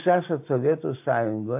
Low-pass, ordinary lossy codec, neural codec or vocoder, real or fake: 3.6 kHz; AAC, 32 kbps; none; real